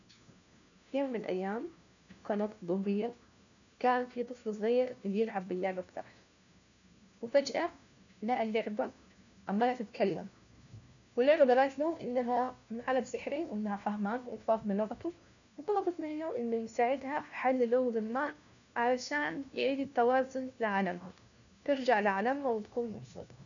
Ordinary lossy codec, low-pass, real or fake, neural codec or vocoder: none; 7.2 kHz; fake; codec, 16 kHz, 1 kbps, FunCodec, trained on LibriTTS, 50 frames a second